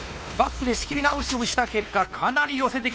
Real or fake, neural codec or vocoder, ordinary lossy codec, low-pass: fake; codec, 16 kHz, 2 kbps, X-Codec, WavLM features, trained on Multilingual LibriSpeech; none; none